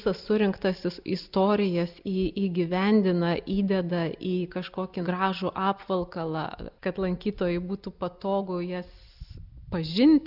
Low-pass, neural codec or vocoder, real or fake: 5.4 kHz; none; real